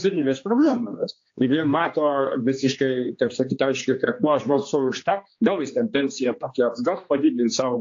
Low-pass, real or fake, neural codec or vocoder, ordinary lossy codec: 7.2 kHz; fake; codec, 16 kHz, 2 kbps, X-Codec, HuBERT features, trained on general audio; AAC, 48 kbps